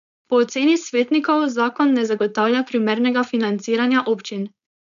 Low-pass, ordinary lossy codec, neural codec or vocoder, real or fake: 7.2 kHz; none; codec, 16 kHz, 4.8 kbps, FACodec; fake